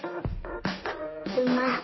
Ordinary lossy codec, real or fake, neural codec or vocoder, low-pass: MP3, 24 kbps; fake; codec, 16 kHz in and 24 kHz out, 2.2 kbps, FireRedTTS-2 codec; 7.2 kHz